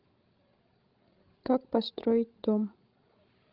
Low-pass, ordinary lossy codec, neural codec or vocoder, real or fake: 5.4 kHz; Opus, 24 kbps; codec, 16 kHz, 16 kbps, FreqCodec, larger model; fake